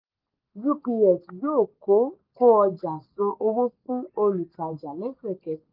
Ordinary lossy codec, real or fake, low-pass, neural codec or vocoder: Opus, 24 kbps; real; 5.4 kHz; none